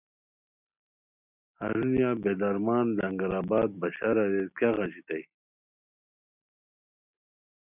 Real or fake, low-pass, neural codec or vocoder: real; 3.6 kHz; none